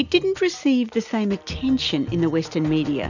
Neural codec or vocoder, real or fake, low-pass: none; real; 7.2 kHz